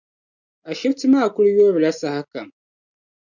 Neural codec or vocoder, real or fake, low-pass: none; real; 7.2 kHz